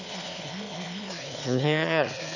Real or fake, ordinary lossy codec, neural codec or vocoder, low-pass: fake; none; autoencoder, 22.05 kHz, a latent of 192 numbers a frame, VITS, trained on one speaker; 7.2 kHz